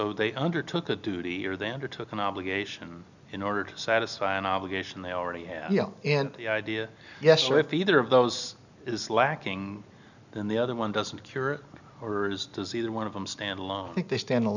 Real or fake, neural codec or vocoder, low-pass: real; none; 7.2 kHz